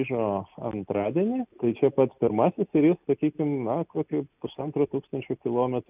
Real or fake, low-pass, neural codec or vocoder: real; 3.6 kHz; none